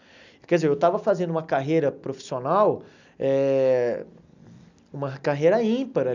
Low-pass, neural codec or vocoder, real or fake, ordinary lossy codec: 7.2 kHz; none; real; none